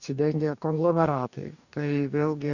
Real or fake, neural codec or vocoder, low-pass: fake; codec, 44.1 kHz, 2.6 kbps, DAC; 7.2 kHz